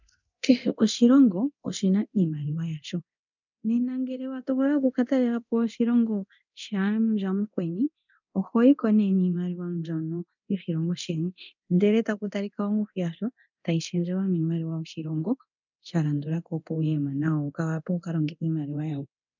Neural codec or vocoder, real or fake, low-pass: codec, 24 kHz, 0.9 kbps, DualCodec; fake; 7.2 kHz